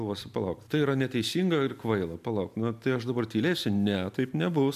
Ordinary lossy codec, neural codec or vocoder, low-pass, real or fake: MP3, 96 kbps; none; 14.4 kHz; real